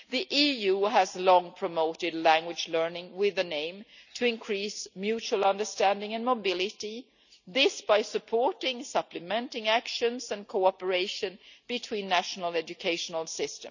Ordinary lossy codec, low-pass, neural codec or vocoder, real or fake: none; 7.2 kHz; none; real